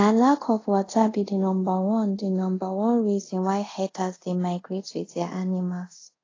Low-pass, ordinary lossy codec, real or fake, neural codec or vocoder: 7.2 kHz; AAC, 32 kbps; fake; codec, 24 kHz, 0.5 kbps, DualCodec